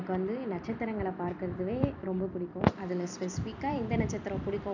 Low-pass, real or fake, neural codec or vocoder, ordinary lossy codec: 7.2 kHz; fake; vocoder, 44.1 kHz, 128 mel bands every 256 samples, BigVGAN v2; none